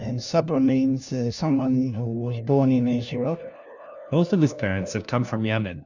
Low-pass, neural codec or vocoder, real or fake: 7.2 kHz; codec, 16 kHz, 1 kbps, FunCodec, trained on LibriTTS, 50 frames a second; fake